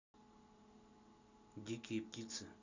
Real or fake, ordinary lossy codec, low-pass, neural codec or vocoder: real; none; 7.2 kHz; none